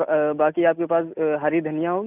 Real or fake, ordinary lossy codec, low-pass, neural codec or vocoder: real; none; 3.6 kHz; none